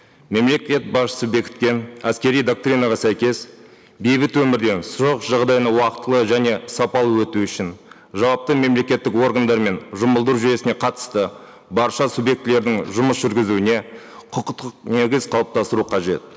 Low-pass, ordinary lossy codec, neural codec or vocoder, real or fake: none; none; none; real